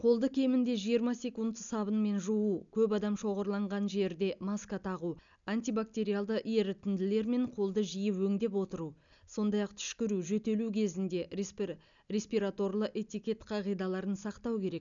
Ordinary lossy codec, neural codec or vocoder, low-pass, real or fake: none; none; 7.2 kHz; real